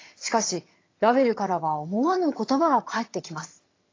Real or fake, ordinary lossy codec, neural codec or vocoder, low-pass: fake; AAC, 32 kbps; vocoder, 22.05 kHz, 80 mel bands, HiFi-GAN; 7.2 kHz